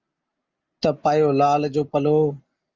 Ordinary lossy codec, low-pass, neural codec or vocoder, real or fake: Opus, 24 kbps; 7.2 kHz; none; real